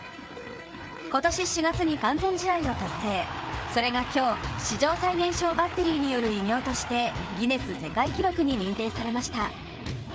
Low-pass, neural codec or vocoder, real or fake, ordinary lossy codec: none; codec, 16 kHz, 4 kbps, FreqCodec, larger model; fake; none